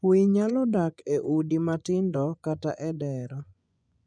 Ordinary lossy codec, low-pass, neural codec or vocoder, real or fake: none; 9.9 kHz; vocoder, 24 kHz, 100 mel bands, Vocos; fake